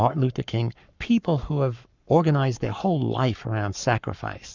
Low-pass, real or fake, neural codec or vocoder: 7.2 kHz; fake; codec, 44.1 kHz, 7.8 kbps, Pupu-Codec